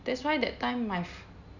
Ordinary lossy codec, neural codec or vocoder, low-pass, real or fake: none; none; 7.2 kHz; real